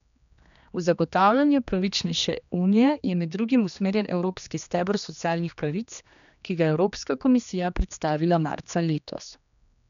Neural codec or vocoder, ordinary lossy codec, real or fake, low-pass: codec, 16 kHz, 2 kbps, X-Codec, HuBERT features, trained on general audio; none; fake; 7.2 kHz